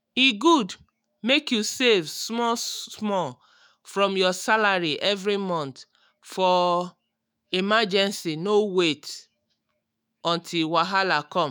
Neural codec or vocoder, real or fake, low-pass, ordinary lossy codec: autoencoder, 48 kHz, 128 numbers a frame, DAC-VAE, trained on Japanese speech; fake; none; none